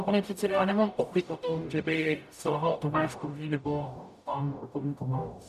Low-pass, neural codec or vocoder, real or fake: 14.4 kHz; codec, 44.1 kHz, 0.9 kbps, DAC; fake